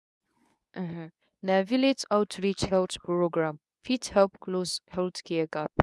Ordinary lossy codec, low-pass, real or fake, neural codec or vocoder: none; none; fake; codec, 24 kHz, 0.9 kbps, WavTokenizer, medium speech release version 2